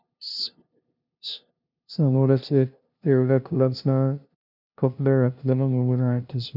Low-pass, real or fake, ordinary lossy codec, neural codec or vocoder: 5.4 kHz; fake; none; codec, 16 kHz, 0.5 kbps, FunCodec, trained on LibriTTS, 25 frames a second